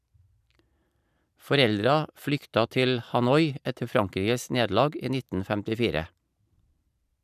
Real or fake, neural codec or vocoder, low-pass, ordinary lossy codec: fake; vocoder, 44.1 kHz, 128 mel bands every 512 samples, BigVGAN v2; 14.4 kHz; none